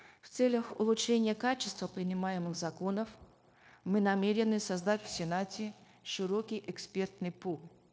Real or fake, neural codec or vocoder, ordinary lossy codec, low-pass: fake; codec, 16 kHz, 0.9 kbps, LongCat-Audio-Codec; none; none